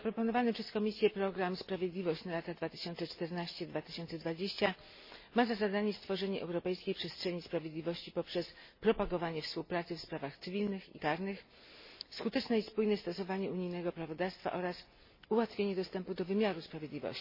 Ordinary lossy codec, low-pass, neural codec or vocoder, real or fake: MP3, 24 kbps; 5.4 kHz; none; real